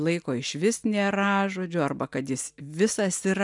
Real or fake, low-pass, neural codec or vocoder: real; 10.8 kHz; none